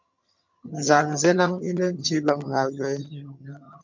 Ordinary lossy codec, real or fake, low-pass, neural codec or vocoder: MP3, 64 kbps; fake; 7.2 kHz; vocoder, 22.05 kHz, 80 mel bands, HiFi-GAN